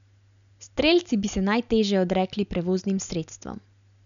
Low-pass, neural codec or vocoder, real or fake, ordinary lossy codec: 7.2 kHz; none; real; none